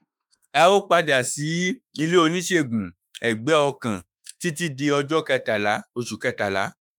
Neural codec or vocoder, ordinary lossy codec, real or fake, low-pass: autoencoder, 48 kHz, 32 numbers a frame, DAC-VAE, trained on Japanese speech; none; fake; none